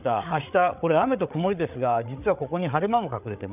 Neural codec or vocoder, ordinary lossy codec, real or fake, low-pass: codec, 16 kHz, 8 kbps, FreqCodec, larger model; none; fake; 3.6 kHz